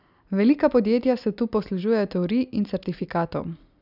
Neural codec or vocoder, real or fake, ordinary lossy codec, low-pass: none; real; none; 5.4 kHz